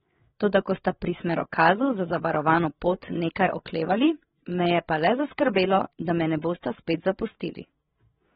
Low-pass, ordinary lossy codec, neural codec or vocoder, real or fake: 7.2 kHz; AAC, 16 kbps; codec, 16 kHz, 8 kbps, FreqCodec, larger model; fake